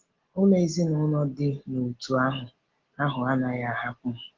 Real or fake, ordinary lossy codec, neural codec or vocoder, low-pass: real; Opus, 16 kbps; none; 7.2 kHz